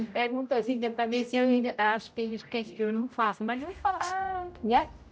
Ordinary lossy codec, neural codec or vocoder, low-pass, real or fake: none; codec, 16 kHz, 0.5 kbps, X-Codec, HuBERT features, trained on general audio; none; fake